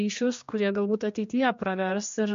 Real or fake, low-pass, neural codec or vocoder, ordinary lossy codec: fake; 7.2 kHz; codec, 16 kHz, 2 kbps, X-Codec, HuBERT features, trained on general audio; MP3, 48 kbps